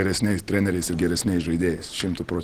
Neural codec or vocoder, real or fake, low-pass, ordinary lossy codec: none; real; 14.4 kHz; Opus, 16 kbps